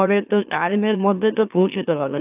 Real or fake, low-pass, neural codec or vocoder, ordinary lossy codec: fake; 3.6 kHz; autoencoder, 44.1 kHz, a latent of 192 numbers a frame, MeloTTS; AAC, 32 kbps